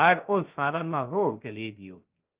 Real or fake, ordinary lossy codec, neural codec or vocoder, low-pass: fake; Opus, 24 kbps; codec, 16 kHz, 0.3 kbps, FocalCodec; 3.6 kHz